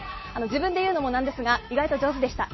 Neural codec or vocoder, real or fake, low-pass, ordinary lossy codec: none; real; 7.2 kHz; MP3, 24 kbps